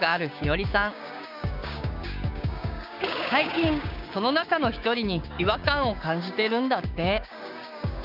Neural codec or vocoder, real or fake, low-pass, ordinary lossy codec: codec, 16 kHz, 6 kbps, DAC; fake; 5.4 kHz; none